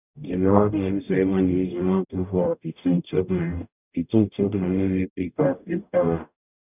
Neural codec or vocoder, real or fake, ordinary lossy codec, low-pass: codec, 44.1 kHz, 0.9 kbps, DAC; fake; none; 3.6 kHz